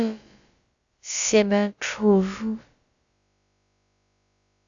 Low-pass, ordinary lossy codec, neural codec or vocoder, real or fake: 7.2 kHz; Opus, 64 kbps; codec, 16 kHz, about 1 kbps, DyCAST, with the encoder's durations; fake